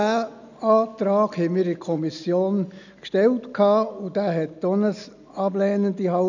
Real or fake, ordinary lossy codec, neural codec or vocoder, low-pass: real; none; none; 7.2 kHz